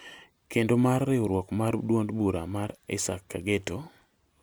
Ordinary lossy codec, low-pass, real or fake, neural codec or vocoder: none; none; real; none